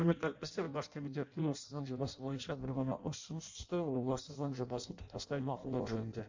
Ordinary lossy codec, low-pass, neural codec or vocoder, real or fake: AAC, 48 kbps; 7.2 kHz; codec, 16 kHz in and 24 kHz out, 0.6 kbps, FireRedTTS-2 codec; fake